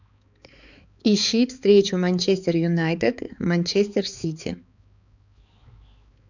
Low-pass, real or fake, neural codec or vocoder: 7.2 kHz; fake; codec, 16 kHz, 4 kbps, X-Codec, HuBERT features, trained on balanced general audio